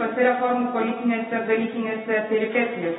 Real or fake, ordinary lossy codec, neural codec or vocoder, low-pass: real; AAC, 16 kbps; none; 7.2 kHz